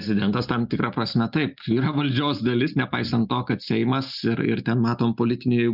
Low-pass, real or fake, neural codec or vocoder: 5.4 kHz; real; none